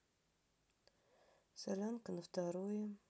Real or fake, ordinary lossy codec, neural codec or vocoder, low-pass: real; none; none; none